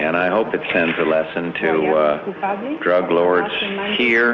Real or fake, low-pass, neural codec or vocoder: real; 7.2 kHz; none